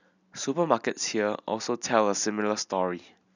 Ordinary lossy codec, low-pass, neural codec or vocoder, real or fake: none; 7.2 kHz; none; real